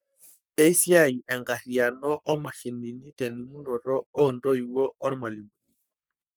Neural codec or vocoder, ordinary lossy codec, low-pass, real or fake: codec, 44.1 kHz, 3.4 kbps, Pupu-Codec; none; none; fake